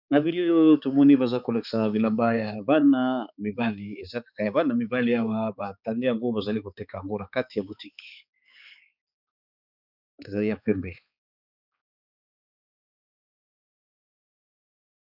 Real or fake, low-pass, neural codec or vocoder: fake; 5.4 kHz; codec, 16 kHz, 4 kbps, X-Codec, HuBERT features, trained on balanced general audio